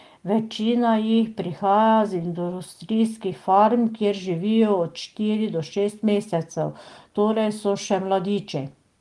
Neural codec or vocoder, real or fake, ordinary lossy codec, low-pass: none; real; Opus, 24 kbps; 10.8 kHz